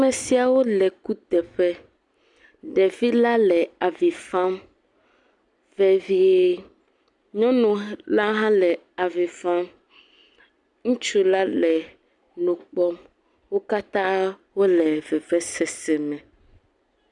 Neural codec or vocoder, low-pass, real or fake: none; 10.8 kHz; real